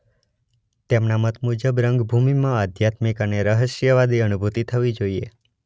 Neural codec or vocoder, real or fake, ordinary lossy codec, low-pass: none; real; none; none